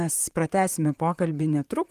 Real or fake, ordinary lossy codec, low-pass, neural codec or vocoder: fake; Opus, 32 kbps; 14.4 kHz; vocoder, 44.1 kHz, 128 mel bands, Pupu-Vocoder